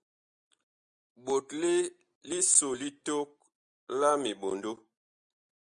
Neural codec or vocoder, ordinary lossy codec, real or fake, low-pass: none; Opus, 64 kbps; real; 9.9 kHz